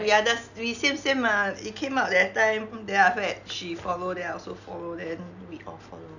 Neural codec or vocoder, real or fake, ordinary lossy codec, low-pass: none; real; none; 7.2 kHz